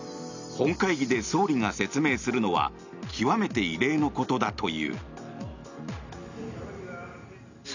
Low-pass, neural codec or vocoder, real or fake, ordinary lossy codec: 7.2 kHz; none; real; none